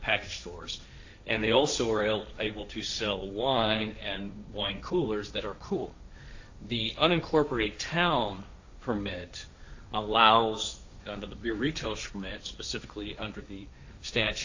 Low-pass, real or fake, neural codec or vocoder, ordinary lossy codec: 7.2 kHz; fake; codec, 16 kHz, 1.1 kbps, Voila-Tokenizer; AAC, 48 kbps